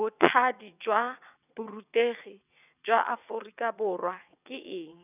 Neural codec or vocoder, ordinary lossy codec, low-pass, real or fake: vocoder, 22.05 kHz, 80 mel bands, WaveNeXt; none; 3.6 kHz; fake